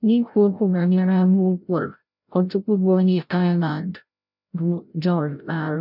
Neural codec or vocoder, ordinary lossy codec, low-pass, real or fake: codec, 16 kHz, 0.5 kbps, FreqCodec, larger model; none; 5.4 kHz; fake